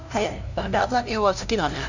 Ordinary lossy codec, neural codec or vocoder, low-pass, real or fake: none; codec, 16 kHz, 0.5 kbps, FunCodec, trained on LibriTTS, 25 frames a second; 7.2 kHz; fake